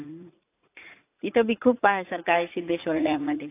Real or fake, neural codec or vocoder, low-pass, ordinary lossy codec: fake; vocoder, 44.1 kHz, 80 mel bands, Vocos; 3.6 kHz; AAC, 24 kbps